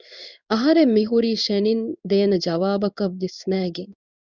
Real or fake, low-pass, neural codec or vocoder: fake; 7.2 kHz; codec, 16 kHz in and 24 kHz out, 1 kbps, XY-Tokenizer